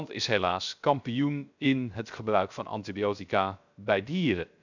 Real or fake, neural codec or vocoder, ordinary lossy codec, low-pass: fake; codec, 16 kHz, 0.3 kbps, FocalCodec; none; 7.2 kHz